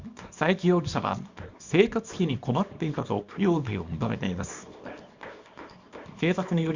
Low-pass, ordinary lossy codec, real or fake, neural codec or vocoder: 7.2 kHz; Opus, 64 kbps; fake; codec, 24 kHz, 0.9 kbps, WavTokenizer, small release